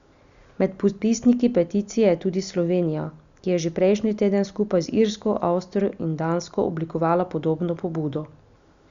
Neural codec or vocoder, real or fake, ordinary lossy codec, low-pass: none; real; Opus, 64 kbps; 7.2 kHz